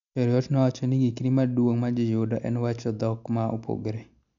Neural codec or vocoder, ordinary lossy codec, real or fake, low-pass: none; none; real; 7.2 kHz